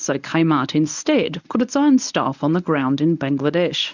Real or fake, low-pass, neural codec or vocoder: fake; 7.2 kHz; codec, 24 kHz, 0.9 kbps, WavTokenizer, medium speech release version 1